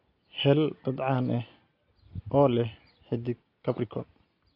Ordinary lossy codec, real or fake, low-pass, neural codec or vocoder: AAC, 32 kbps; real; 5.4 kHz; none